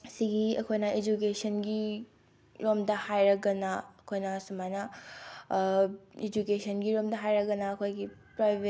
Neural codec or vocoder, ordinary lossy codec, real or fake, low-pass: none; none; real; none